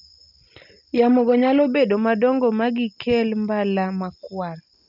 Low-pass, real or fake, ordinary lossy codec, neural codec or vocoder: 5.4 kHz; real; none; none